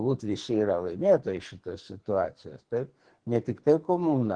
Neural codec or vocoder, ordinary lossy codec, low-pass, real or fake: codec, 44.1 kHz, 2.6 kbps, SNAC; Opus, 16 kbps; 9.9 kHz; fake